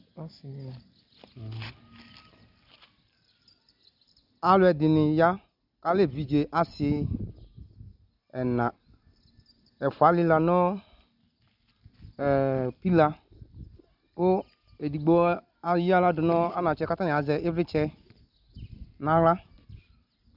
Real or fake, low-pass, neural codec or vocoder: real; 5.4 kHz; none